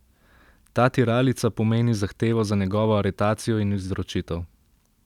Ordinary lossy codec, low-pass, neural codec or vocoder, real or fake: none; 19.8 kHz; vocoder, 44.1 kHz, 128 mel bands every 256 samples, BigVGAN v2; fake